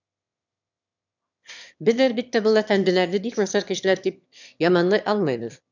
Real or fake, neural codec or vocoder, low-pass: fake; autoencoder, 22.05 kHz, a latent of 192 numbers a frame, VITS, trained on one speaker; 7.2 kHz